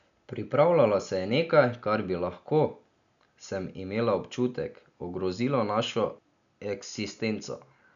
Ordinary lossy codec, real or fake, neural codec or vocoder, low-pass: none; real; none; 7.2 kHz